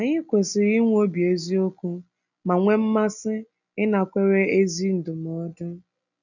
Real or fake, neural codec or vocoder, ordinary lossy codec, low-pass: real; none; none; 7.2 kHz